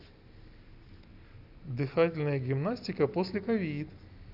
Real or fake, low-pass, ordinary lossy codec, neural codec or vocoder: real; 5.4 kHz; none; none